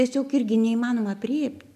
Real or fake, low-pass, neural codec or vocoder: real; 14.4 kHz; none